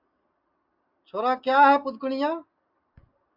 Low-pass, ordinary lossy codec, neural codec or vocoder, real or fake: 5.4 kHz; MP3, 48 kbps; none; real